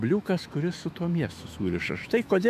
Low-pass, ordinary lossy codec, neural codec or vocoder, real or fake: 14.4 kHz; AAC, 96 kbps; autoencoder, 48 kHz, 128 numbers a frame, DAC-VAE, trained on Japanese speech; fake